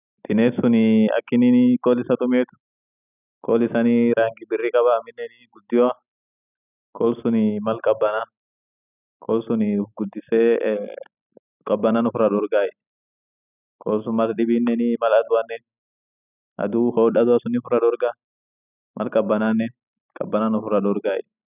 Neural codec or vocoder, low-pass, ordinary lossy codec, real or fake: none; 3.6 kHz; none; real